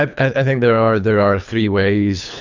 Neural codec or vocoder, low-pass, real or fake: codec, 24 kHz, 3 kbps, HILCodec; 7.2 kHz; fake